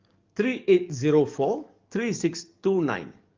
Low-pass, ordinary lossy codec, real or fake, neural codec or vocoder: 7.2 kHz; Opus, 24 kbps; fake; codec, 44.1 kHz, 7.8 kbps, DAC